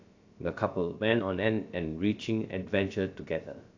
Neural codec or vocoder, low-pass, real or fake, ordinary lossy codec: codec, 16 kHz, about 1 kbps, DyCAST, with the encoder's durations; 7.2 kHz; fake; AAC, 48 kbps